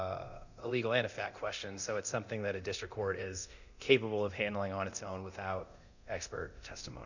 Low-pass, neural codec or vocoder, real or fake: 7.2 kHz; codec, 24 kHz, 0.9 kbps, DualCodec; fake